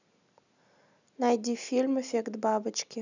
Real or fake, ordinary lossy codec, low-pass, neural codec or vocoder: real; none; 7.2 kHz; none